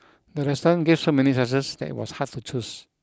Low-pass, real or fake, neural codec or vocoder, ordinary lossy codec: none; real; none; none